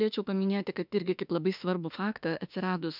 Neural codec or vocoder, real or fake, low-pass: autoencoder, 48 kHz, 32 numbers a frame, DAC-VAE, trained on Japanese speech; fake; 5.4 kHz